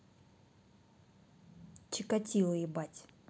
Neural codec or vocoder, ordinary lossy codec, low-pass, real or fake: none; none; none; real